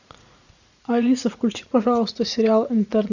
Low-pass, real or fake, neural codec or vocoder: 7.2 kHz; fake; vocoder, 44.1 kHz, 80 mel bands, Vocos